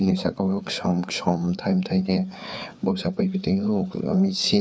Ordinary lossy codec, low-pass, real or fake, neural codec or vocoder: none; none; fake; codec, 16 kHz, 4 kbps, FreqCodec, larger model